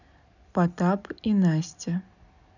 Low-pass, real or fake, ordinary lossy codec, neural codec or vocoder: 7.2 kHz; real; none; none